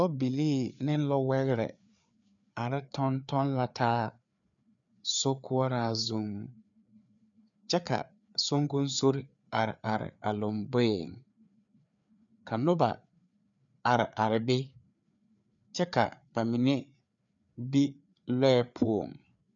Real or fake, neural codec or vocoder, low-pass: fake; codec, 16 kHz, 4 kbps, FreqCodec, larger model; 7.2 kHz